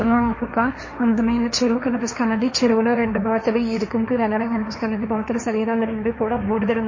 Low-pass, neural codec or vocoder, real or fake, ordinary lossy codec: 7.2 kHz; codec, 16 kHz, 1.1 kbps, Voila-Tokenizer; fake; MP3, 32 kbps